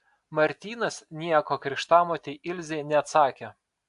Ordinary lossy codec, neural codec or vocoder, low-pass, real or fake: AAC, 64 kbps; none; 10.8 kHz; real